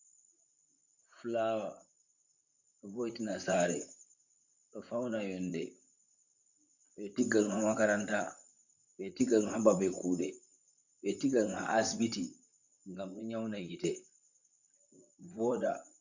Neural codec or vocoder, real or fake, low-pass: vocoder, 44.1 kHz, 128 mel bands, Pupu-Vocoder; fake; 7.2 kHz